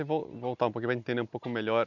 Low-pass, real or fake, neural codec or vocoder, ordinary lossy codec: 7.2 kHz; real; none; none